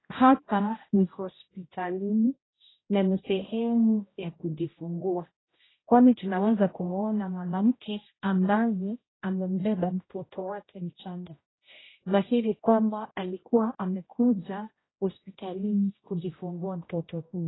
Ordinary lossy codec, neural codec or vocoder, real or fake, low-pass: AAC, 16 kbps; codec, 16 kHz, 0.5 kbps, X-Codec, HuBERT features, trained on general audio; fake; 7.2 kHz